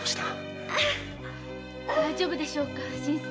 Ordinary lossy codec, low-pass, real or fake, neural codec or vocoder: none; none; real; none